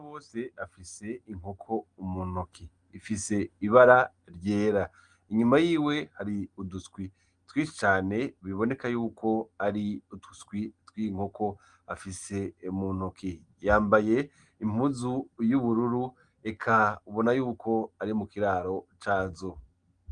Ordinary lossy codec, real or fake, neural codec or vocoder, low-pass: Opus, 24 kbps; real; none; 9.9 kHz